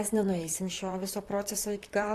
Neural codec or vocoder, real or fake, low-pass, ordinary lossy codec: vocoder, 44.1 kHz, 128 mel bands, Pupu-Vocoder; fake; 14.4 kHz; AAC, 64 kbps